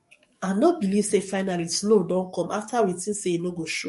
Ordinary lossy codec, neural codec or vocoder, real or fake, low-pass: MP3, 48 kbps; codec, 44.1 kHz, 7.8 kbps, DAC; fake; 14.4 kHz